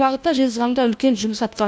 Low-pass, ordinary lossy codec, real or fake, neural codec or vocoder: none; none; fake; codec, 16 kHz, 1 kbps, FunCodec, trained on LibriTTS, 50 frames a second